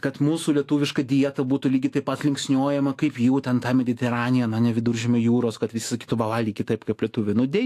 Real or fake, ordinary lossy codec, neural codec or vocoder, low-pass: fake; AAC, 64 kbps; autoencoder, 48 kHz, 128 numbers a frame, DAC-VAE, trained on Japanese speech; 14.4 kHz